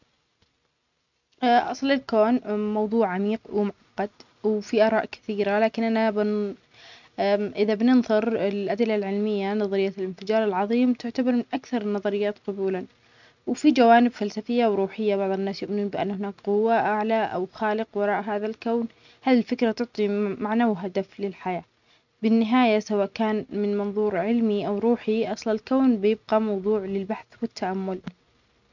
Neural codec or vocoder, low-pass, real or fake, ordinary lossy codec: none; 7.2 kHz; real; none